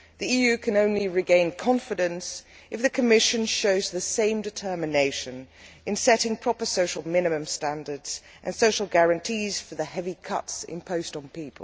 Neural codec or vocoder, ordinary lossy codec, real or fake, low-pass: none; none; real; none